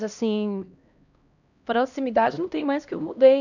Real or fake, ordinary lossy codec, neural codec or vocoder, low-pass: fake; none; codec, 16 kHz, 1 kbps, X-Codec, HuBERT features, trained on LibriSpeech; 7.2 kHz